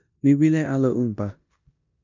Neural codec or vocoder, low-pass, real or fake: codec, 16 kHz in and 24 kHz out, 0.9 kbps, LongCat-Audio-Codec, four codebook decoder; 7.2 kHz; fake